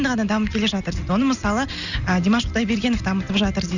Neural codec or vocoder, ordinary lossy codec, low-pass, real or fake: none; none; 7.2 kHz; real